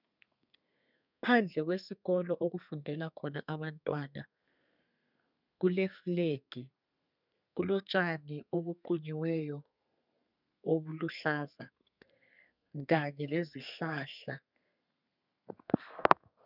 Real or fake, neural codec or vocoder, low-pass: fake; codec, 32 kHz, 1.9 kbps, SNAC; 5.4 kHz